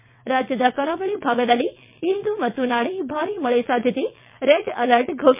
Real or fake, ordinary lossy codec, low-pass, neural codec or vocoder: fake; MP3, 32 kbps; 3.6 kHz; vocoder, 22.05 kHz, 80 mel bands, WaveNeXt